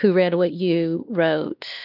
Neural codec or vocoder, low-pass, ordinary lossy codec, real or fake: codec, 16 kHz, 2 kbps, X-Codec, WavLM features, trained on Multilingual LibriSpeech; 5.4 kHz; Opus, 24 kbps; fake